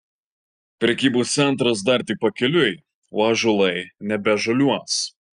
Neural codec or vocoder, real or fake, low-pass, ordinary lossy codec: none; real; 9.9 kHz; Opus, 64 kbps